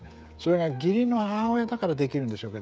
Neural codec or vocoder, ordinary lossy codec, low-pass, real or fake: codec, 16 kHz, 16 kbps, FreqCodec, smaller model; none; none; fake